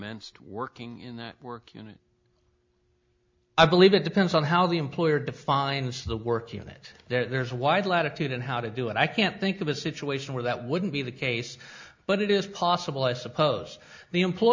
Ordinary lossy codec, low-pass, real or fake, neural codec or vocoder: MP3, 64 kbps; 7.2 kHz; real; none